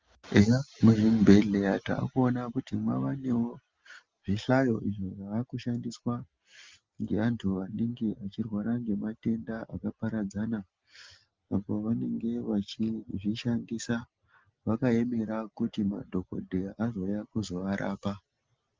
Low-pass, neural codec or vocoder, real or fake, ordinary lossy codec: 7.2 kHz; none; real; Opus, 32 kbps